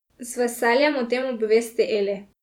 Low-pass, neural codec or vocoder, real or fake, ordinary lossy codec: 19.8 kHz; vocoder, 44.1 kHz, 128 mel bands every 512 samples, BigVGAN v2; fake; none